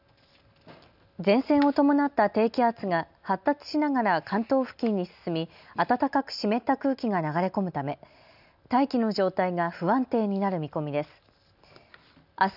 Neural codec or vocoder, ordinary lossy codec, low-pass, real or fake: none; none; 5.4 kHz; real